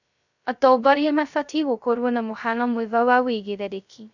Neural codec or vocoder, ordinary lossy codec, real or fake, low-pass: codec, 16 kHz, 0.2 kbps, FocalCodec; none; fake; 7.2 kHz